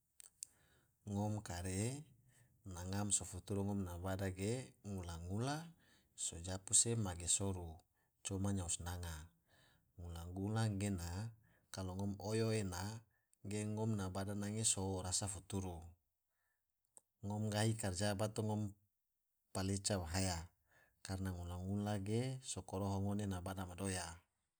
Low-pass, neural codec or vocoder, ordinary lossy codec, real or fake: none; none; none; real